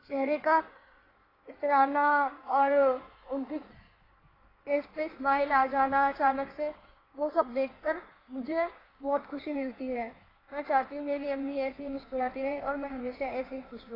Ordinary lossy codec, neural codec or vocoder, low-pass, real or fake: AAC, 24 kbps; codec, 16 kHz in and 24 kHz out, 1.1 kbps, FireRedTTS-2 codec; 5.4 kHz; fake